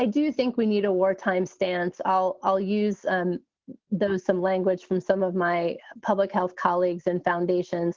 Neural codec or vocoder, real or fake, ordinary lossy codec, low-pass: none; real; Opus, 16 kbps; 7.2 kHz